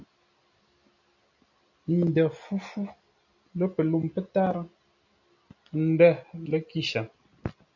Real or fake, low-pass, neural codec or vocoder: real; 7.2 kHz; none